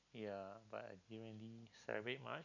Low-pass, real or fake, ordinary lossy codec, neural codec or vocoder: 7.2 kHz; real; none; none